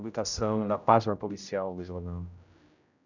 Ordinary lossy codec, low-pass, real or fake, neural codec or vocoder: none; 7.2 kHz; fake; codec, 16 kHz, 0.5 kbps, X-Codec, HuBERT features, trained on general audio